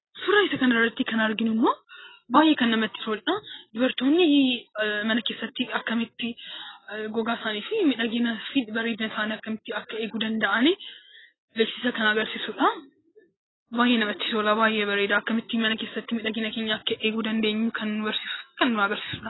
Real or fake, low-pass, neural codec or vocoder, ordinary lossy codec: real; 7.2 kHz; none; AAC, 16 kbps